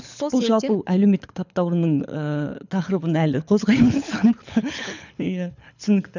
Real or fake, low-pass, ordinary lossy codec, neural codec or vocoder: fake; 7.2 kHz; none; codec, 24 kHz, 6 kbps, HILCodec